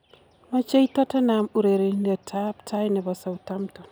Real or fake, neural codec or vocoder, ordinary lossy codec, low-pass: real; none; none; none